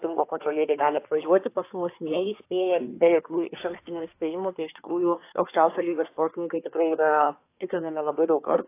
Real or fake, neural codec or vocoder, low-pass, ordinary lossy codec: fake; codec, 24 kHz, 1 kbps, SNAC; 3.6 kHz; AAC, 24 kbps